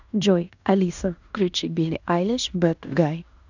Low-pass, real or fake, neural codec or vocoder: 7.2 kHz; fake; codec, 16 kHz in and 24 kHz out, 0.9 kbps, LongCat-Audio-Codec, fine tuned four codebook decoder